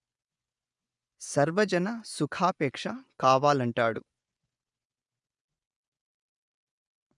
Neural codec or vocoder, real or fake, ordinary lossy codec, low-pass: vocoder, 24 kHz, 100 mel bands, Vocos; fake; none; 10.8 kHz